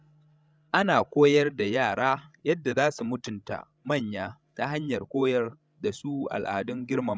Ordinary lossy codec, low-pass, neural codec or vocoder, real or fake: none; none; codec, 16 kHz, 16 kbps, FreqCodec, larger model; fake